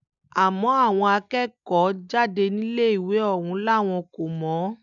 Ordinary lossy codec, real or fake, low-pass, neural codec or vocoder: none; real; 7.2 kHz; none